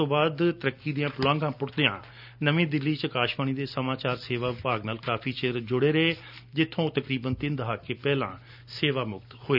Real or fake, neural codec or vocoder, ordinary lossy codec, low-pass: real; none; none; 5.4 kHz